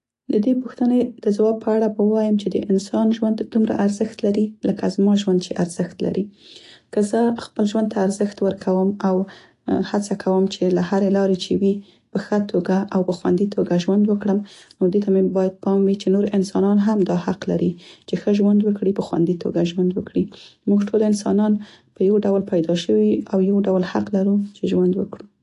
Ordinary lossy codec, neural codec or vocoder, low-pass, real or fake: AAC, 48 kbps; none; 10.8 kHz; real